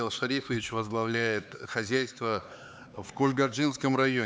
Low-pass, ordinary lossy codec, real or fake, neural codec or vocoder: none; none; fake; codec, 16 kHz, 4 kbps, X-Codec, HuBERT features, trained on LibriSpeech